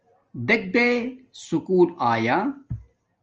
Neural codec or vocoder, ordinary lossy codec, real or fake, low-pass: none; Opus, 24 kbps; real; 7.2 kHz